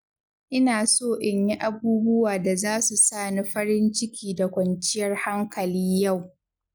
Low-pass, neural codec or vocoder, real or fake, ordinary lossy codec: none; none; real; none